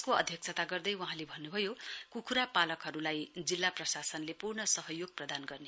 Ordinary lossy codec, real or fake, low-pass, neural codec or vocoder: none; real; none; none